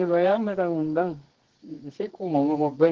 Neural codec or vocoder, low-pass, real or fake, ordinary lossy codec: codec, 24 kHz, 0.9 kbps, WavTokenizer, medium music audio release; 7.2 kHz; fake; Opus, 16 kbps